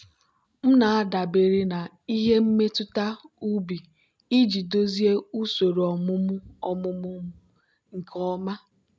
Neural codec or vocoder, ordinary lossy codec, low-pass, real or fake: none; none; none; real